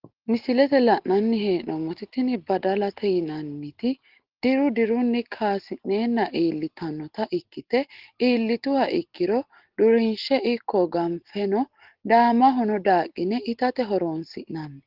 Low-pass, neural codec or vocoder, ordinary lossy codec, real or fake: 5.4 kHz; none; Opus, 16 kbps; real